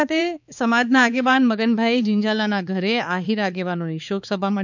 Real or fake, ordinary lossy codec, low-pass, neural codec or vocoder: fake; none; 7.2 kHz; codec, 16 kHz, 4 kbps, X-Codec, HuBERT features, trained on balanced general audio